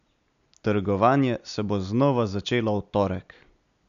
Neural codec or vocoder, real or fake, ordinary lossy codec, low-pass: none; real; MP3, 96 kbps; 7.2 kHz